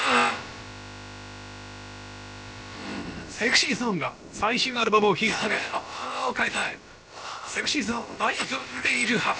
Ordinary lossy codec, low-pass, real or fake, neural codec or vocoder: none; none; fake; codec, 16 kHz, about 1 kbps, DyCAST, with the encoder's durations